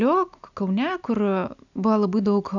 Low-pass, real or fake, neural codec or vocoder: 7.2 kHz; real; none